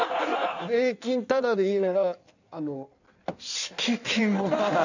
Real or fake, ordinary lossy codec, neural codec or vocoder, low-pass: fake; none; codec, 16 kHz in and 24 kHz out, 1.1 kbps, FireRedTTS-2 codec; 7.2 kHz